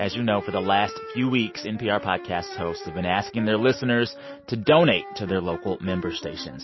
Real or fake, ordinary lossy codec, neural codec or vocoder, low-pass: real; MP3, 24 kbps; none; 7.2 kHz